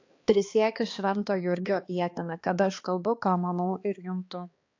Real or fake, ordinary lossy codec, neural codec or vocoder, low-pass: fake; AAC, 48 kbps; codec, 16 kHz, 2 kbps, X-Codec, HuBERT features, trained on balanced general audio; 7.2 kHz